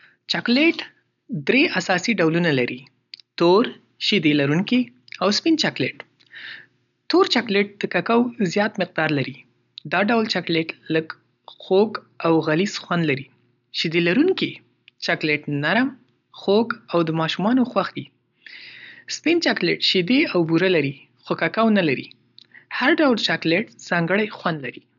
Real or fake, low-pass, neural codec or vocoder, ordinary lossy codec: real; 7.2 kHz; none; none